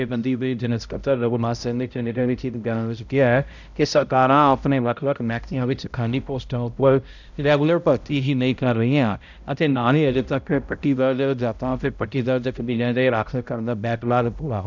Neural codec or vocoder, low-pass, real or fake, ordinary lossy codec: codec, 16 kHz, 0.5 kbps, X-Codec, HuBERT features, trained on balanced general audio; 7.2 kHz; fake; none